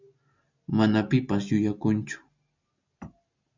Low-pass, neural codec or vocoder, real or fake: 7.2 kHz; none; real